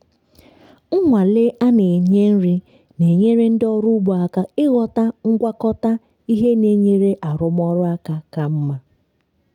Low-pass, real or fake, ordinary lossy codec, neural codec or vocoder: 19.8 kHz; real; none; none